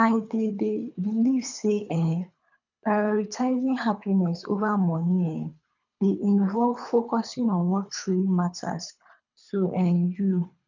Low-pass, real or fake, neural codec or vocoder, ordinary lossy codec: 7.2 kHz; fake; codec, 24 kHz, 3 kbps, HILCodec; none